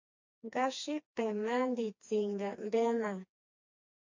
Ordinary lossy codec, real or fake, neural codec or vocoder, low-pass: MP3, 48 kbps; fake; codec, 16 kHz, 2 kbps, FreqCodec, smaller model; 7.2 kHz